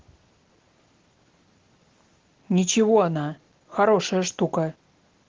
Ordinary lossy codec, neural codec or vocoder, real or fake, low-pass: Opus, 16 kbps; none; real; 7.2 kHz